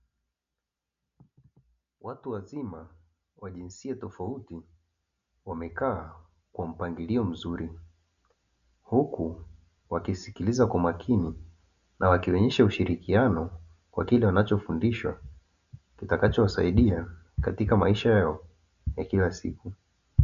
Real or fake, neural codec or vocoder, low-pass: real; none; 7.2 kHz